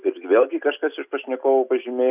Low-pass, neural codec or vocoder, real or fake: 3.6 kHz; none; real